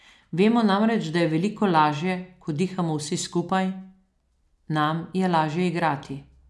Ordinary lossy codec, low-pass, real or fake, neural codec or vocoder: none; none; real; none